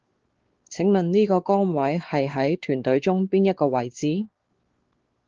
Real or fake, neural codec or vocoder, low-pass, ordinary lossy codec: fake; codec, 16 kHz, 4 kbps, X-Codec, WavLM features, trained on Multilingual LibriSpeech; 7.2 kHz; Opus, 16 kbps